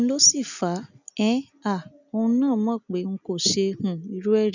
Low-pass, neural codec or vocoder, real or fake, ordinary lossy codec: 7.2 kHz; none; real; none